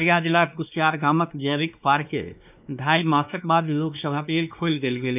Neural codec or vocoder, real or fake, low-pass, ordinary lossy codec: codec, 16 kHz, 2 kbps, X-Codec, WavLM features, trained on Multilingual LibriSpeech; fake; 3.6 kHz; none